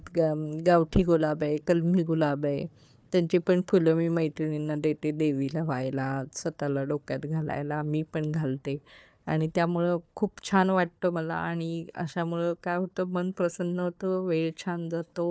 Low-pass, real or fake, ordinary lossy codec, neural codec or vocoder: none; fake; none; codec, 16 kHz, 4 kbps, FunCodec, trained on Chinese and English, 50 frames a second